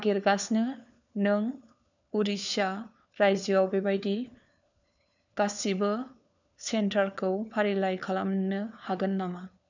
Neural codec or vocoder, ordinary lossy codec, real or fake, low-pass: codec, 16 kHz, 4 kbps, FunCodec, trained on LibriTTS, 50 frames a second; none; fake; 7.2 kHz